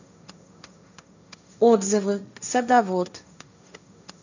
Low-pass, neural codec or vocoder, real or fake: 7.2 kHz; codec, 16 kHz, 1.1 kbps, Voila-Tokenizer; fake